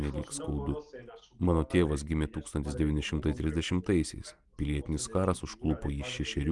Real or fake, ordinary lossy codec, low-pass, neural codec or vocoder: real; Opus, 24 kbps; 10.8 kHz; none